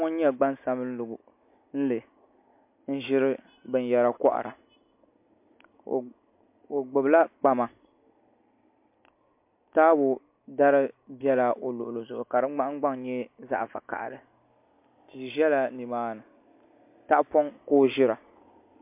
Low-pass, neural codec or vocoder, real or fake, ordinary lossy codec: 3.6 kHz; none; real; MP3, 32 kbps